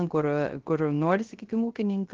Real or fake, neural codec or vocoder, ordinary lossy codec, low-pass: fake; codec, 16 kHz, 0.3 kbps, FocalCodec; Opus, 16 kbps; 7.2 kHz